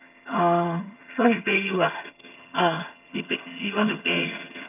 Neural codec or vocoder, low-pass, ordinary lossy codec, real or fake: vocoder, 22.05 kHz, 80 mel bands, HiFi-GAN; 3.6 kHz; none; fake